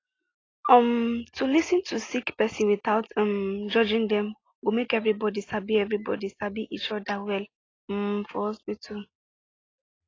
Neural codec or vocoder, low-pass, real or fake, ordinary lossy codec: none; 7.2 kHz; real; AAC, 32 kbps